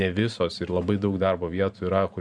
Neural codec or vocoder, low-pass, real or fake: vocoder, 44.1 kHz, 128 mel bands every 512 samples, BigVGAN v2; 9.9 kHz; fake